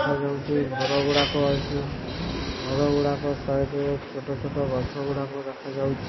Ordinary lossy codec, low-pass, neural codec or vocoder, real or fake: MP3, 24 kbps; 7.2 kHz; none; real